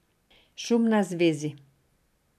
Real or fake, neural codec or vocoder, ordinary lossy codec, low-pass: real; none; none; 14.4 kHz